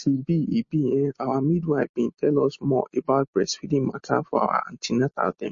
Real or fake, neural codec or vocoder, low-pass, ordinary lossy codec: real; none; 7.2 kHz; MP3, 32 kbps